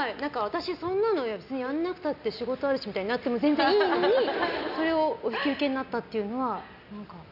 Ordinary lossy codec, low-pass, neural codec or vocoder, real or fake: none; 5.4 kHz; none; real